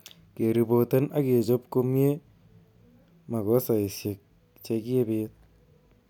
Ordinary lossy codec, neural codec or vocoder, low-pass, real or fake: none; none; 19.8 kHz; real